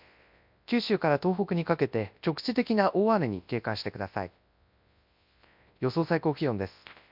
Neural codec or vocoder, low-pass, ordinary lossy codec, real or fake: codec, 24 kHz, 0.9 kbps, WavTokenizer, large speech release; 5.4 kHz; MP3, 48 kbps; fake